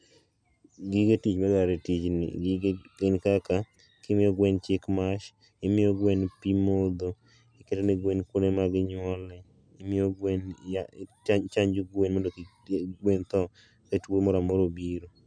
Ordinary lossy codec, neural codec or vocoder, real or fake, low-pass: none; none; real; 9.9 kHz